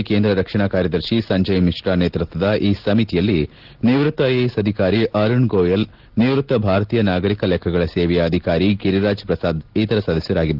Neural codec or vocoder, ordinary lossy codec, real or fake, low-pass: none; Opus, 16 kbps; real; 5.4 kHz